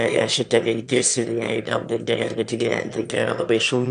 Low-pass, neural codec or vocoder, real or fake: 9.9 kHz; autoencoder, 22.05 kHz, a latent of 192 numbers a frame, VITS, trained on one speaker; fake